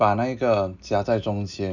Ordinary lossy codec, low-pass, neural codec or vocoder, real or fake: none; 7.2 kHz; none; real